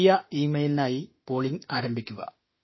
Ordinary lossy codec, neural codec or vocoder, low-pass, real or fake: MP3, 24 kbps; autoencoder, 48 kHz, 32 numbers a frame, DAC-VAE, trained on Japanese speech; 7.2 kHz; fake